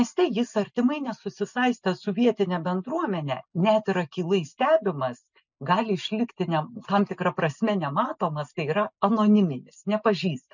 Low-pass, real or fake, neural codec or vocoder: 7.2 kHz; real; none